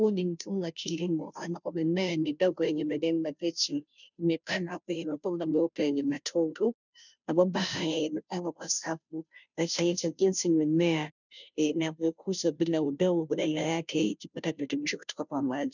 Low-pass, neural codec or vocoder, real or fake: 7.2 kHz; codec, 16 kHz, 0.5 kbps, FunCodec, trained on Chinese and English, 25 frames a second; fake